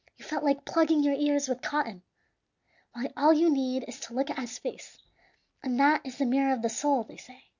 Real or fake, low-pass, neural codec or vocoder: real; 7.2 kHz; none